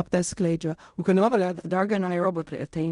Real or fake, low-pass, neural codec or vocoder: fake; 10.8 kHz; codec, 16 kHz in and 24 kHz out, 0.4 kbps, LongCat-Audio-Codec, fine tuned four codebook decoder